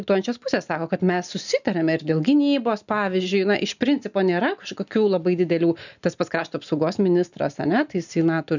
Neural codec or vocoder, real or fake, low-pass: none; real; 7.2 kHz